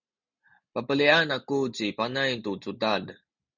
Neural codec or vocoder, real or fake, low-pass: none; real; 7.2 kHz